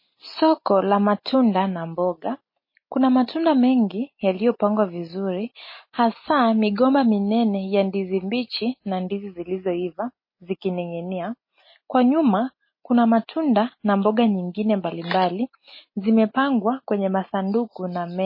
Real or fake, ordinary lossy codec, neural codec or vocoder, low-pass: real; MP3, 24 kbps; none; 5.4 kHz